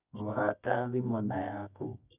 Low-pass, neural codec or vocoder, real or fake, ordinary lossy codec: 3.6 kHz; codec, 24 kHz, 0.9 kbps, WavTokenizer, medium music audio release; fake; none